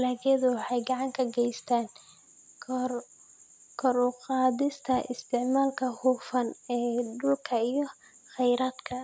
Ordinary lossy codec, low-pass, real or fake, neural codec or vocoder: none; none; real; none